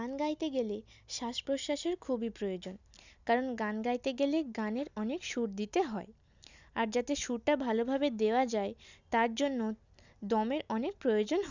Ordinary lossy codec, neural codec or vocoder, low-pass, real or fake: none; none; 7.2 kHz; real